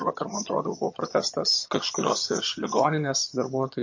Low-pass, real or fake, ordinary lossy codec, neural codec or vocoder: 7.2 kHz; fake; MP3, 32 kbps; vocoder, 22.05 kHz, 80 mel bands, HiFi-GAN